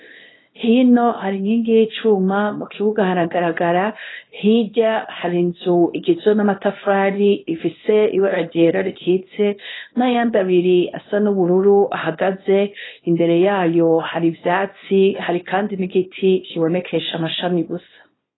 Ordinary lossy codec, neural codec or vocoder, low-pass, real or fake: AAC, 16 kbps; codec, 24 kHz, 0.9 kbps, WavTokenizer, small release; 7.2 kHz; fake